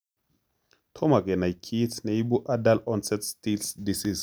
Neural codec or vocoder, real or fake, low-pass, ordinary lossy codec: none; real; none; none